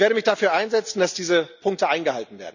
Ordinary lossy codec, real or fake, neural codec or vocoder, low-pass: none; real; none; 7.2 kHz